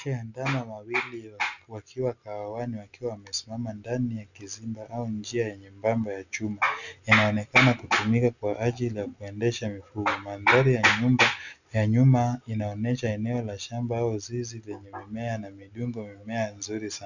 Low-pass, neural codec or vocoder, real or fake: 7.2 kHz; none; real